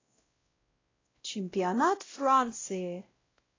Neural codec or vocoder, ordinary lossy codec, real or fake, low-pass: codec, 16 kHz, 0.5 kbps, X-Codec, WavLM features, trained on Multilingual LibriSpeech; AAC, 32 kbps; fake; 7.2 kHz